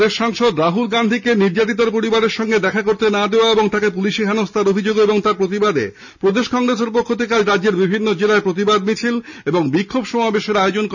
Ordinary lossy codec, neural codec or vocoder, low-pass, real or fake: none; none; 7.2 kHz; real